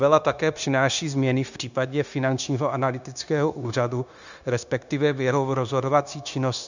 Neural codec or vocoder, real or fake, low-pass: codec, 16 kHz, 0.9 kbps, LongCat-Audio-Codec; fake; 7.2 kHz